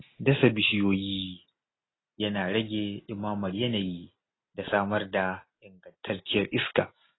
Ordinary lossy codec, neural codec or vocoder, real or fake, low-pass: AAC, 16 kbps; none; real; 7.2 kHz